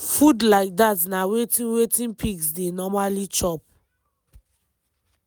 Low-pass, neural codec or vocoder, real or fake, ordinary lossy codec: none; none; real; none